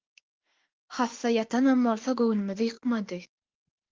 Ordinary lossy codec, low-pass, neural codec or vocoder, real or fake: Opus, 16 kbps; 7.2 kHz; autoencoder, 48 kHz, 32 numbers a frame, DAC-VAE, trained on Japanese speech; fake